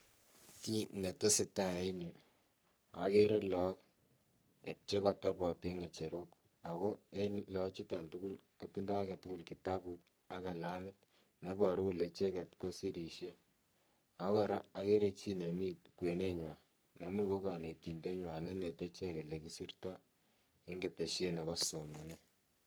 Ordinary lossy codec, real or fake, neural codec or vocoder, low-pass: none; fake; codec, 44.1 kHz, 3.4 kbps, Pupu-Codec; none